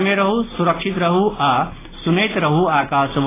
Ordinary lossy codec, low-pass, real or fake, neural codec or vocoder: AAC, 16 kbps; 3.6 kHz; real; none